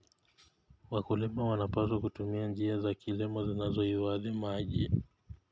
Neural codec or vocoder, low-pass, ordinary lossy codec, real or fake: none; none; none; real